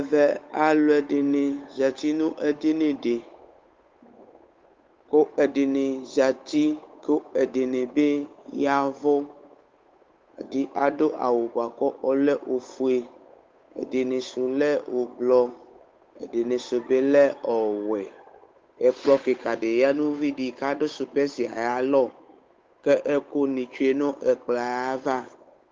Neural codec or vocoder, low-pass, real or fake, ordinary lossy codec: codec, 16 kHz, 8 kbps, FunCodec, trained on Chinese and English, 25 frames a second; 7.2 kHz; fake; Opus, 24 kbps